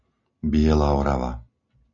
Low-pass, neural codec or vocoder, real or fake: 7.2 kHz; none; real